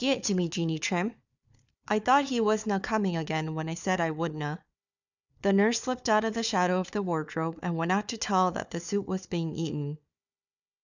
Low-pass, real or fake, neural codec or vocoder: 7.2 kHz; fake; codec, 16 kHz, 4 kbps, FunCodec, trained on Chinese and English, 50 frames a second